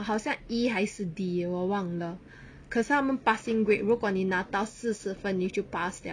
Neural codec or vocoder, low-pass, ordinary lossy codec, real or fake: none; 9.9 kHz; none; real